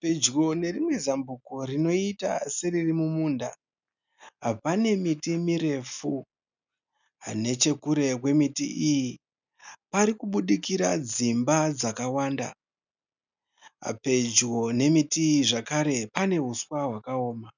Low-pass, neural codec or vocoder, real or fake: 7.2 kHz; none; real